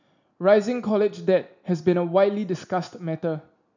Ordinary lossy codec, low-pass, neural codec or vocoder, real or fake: none; 7.2 kHz; none; real